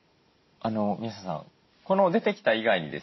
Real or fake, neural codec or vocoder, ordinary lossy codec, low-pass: real; none; MP3, 24 kbps; 7.2 kHz